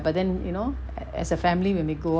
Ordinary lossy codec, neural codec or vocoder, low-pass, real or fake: none; none; none; real